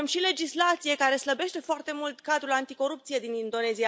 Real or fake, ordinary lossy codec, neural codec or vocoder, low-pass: real; none; none; none